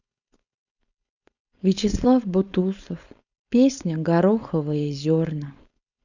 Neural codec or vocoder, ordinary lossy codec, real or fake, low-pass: codec, 16 kHz, 4.8 kbps, FACodec; none; fake; 7.2 kHz